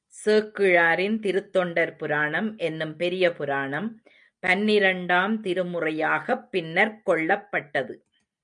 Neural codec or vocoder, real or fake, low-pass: none; real; 9.9 kHz